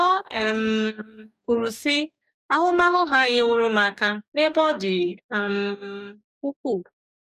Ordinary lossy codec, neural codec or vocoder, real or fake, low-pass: none; codec, 44.1 kHz, 2.6 kbps, DAC; fake; 14.4 kHz